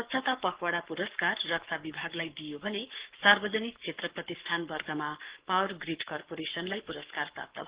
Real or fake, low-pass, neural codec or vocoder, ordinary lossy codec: fake; 3.6 kHz; codec, 44.1 kHz, 7.8 kbps, Pupu-Codec; Opus, 16 kbps